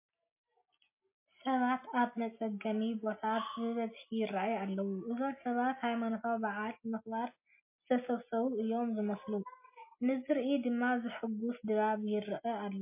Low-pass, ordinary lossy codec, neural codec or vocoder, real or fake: 3.6 kHz; MP3, 24 kbps; none; real